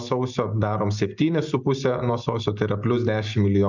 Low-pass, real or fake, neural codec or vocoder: 7.2 kHz; real; none